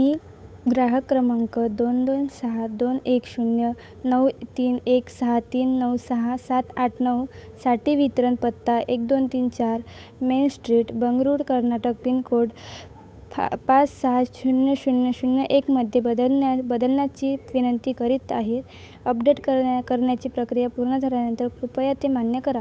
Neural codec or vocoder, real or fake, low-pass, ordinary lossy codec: codec, 16 kHz, 8 kbps, FunCodec, trained on Chinese and English, 25 frames a second; fake; none; none